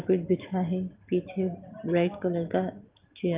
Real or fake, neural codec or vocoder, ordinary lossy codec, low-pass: real; none; Opus, 32 kbps; 3.6 kHz